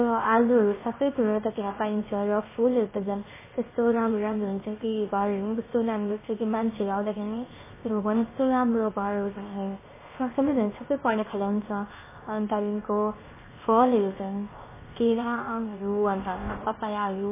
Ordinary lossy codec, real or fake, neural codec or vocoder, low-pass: MP3, 16 kbps; fake; codec, 16 kHz, 0.7 kbps, FocalCodec; 3.6 kHz